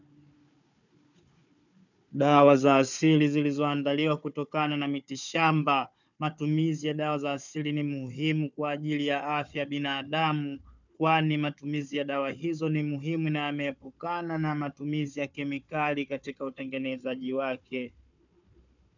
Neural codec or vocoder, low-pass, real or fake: codec, 16 kHz, 4 kbps, FunCodec, trained on Chinese and English, 50 frames a second; 7.2 kHz; fake